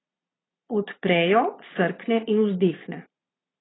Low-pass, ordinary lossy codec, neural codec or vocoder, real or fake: 7.2 kHz; AAC, 16 kbps; vocoder, 22.05 kHz, 80 mel bands, Vocos; fake